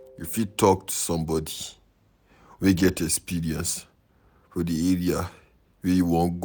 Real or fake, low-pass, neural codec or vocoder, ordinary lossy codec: real; none; none; none